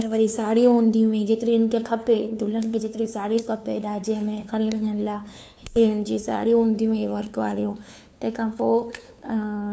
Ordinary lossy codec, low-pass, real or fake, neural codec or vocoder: none; none; fake; codec, 16 kHz, 2 kbps, FunCodec, trained on LibriTTS, 25 frames a second